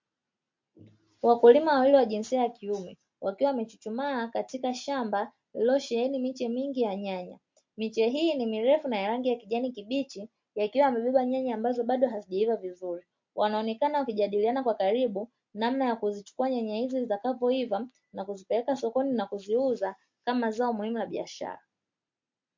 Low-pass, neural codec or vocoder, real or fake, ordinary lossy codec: 7.2 kHz; none; real; MP3, 48 kbps